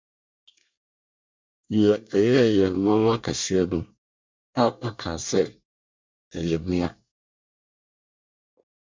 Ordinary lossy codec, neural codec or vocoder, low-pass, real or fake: MP3, 64 kbps; codec, 24 kHz, 1 kbps, SNAC; 7.2 kHz; fake